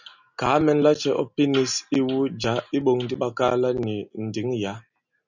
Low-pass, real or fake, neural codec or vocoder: 7.2 kHz; real; none